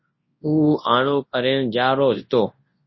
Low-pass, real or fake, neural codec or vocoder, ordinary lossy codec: 7.2 kHz; fake; codec, 24 kHz, 0.9 kbps, WavTokenizer, large speech release; MP3, 24 kbps